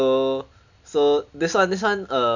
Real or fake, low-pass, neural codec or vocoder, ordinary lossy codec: real; 7.2 kHz; none; none